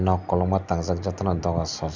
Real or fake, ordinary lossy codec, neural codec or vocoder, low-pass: real; none; none; 7.2 kHz